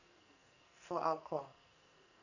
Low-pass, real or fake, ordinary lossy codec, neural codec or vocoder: 7.2 kHz; fake; none; codec, 44.1 kHz, 2.6 kbps, SNAC